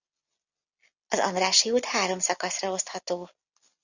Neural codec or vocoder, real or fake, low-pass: none; real; 7.2 kHz